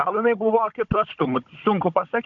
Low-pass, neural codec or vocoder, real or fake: 7.2 kHz; codec, 16 kHz, 16 kbps, FunCodec, trained on Chinese and English, 50 frames a second; fake